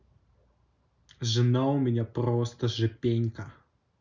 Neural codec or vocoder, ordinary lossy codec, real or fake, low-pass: none; none; real; 7.2 kHz